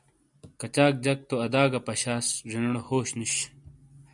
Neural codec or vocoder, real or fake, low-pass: none; real; 10.8 kHz